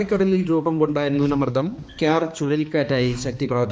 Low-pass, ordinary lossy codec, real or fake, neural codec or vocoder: none; none; fake; codec, 16 kHz, 2 kbps, X-Codec, HuBERT features, trained on balanced general audio